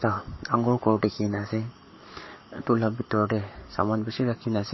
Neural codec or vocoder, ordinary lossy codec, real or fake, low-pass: codec, 44.1 kHz, 7.8 kbps, Pupu-Codec; MP3, 24 kbps; fake; 7.2 kHz